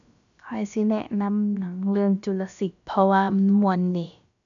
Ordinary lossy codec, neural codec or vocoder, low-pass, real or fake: none; codec, 16 kHz, about 1 kbps, DyCAST, with the encoder's durations; 7.2 kHz; fake